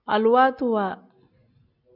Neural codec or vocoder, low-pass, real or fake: none; 5.4 kHz; real